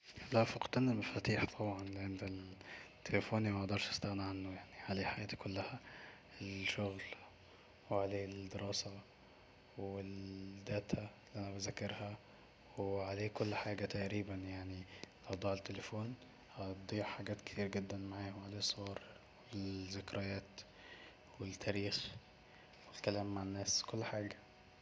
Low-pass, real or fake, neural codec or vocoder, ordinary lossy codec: none; real; none; none